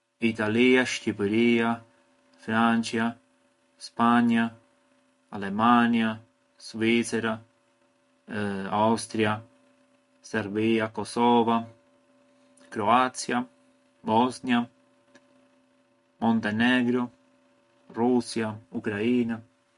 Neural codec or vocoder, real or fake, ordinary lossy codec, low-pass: none; real; MP3, 48 kbps; 14.4 kHz